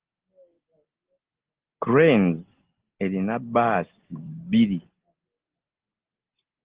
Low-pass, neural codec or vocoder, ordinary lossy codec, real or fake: 3.6 kHz; none; Opus, 16 kbps; real